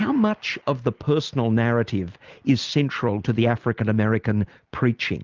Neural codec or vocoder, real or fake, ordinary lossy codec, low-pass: none; real; Opus, 32 kbps; 7.2 kHz